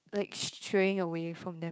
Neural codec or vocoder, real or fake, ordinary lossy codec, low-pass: codec, 16 kHz, 6 kbps, DAC; fake; none; none